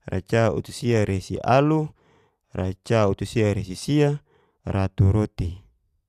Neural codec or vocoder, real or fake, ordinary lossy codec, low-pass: none; real; AAC, 96 kbps; 14.4 kHz